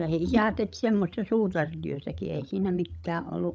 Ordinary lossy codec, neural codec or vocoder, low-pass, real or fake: none; codec, 16 kHz, 16 kbps, FreqCodec, larger model; none; fake